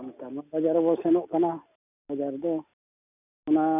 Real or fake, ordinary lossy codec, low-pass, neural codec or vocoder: real; none; 3.6 kHz; none